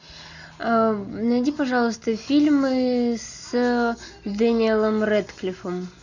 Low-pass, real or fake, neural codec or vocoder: 7.2 kHz; real; none